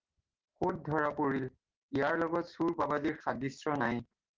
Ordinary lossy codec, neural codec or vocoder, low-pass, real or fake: Opus, 16 kbps; none; 7.2 kHz; real